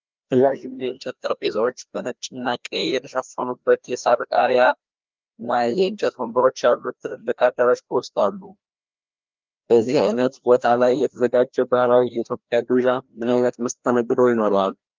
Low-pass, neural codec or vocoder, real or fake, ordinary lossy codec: 7.2 kHz; codec, 16 kHz, 1 kbps, FreqCodec, larger model; fake; Opus, 32 kbps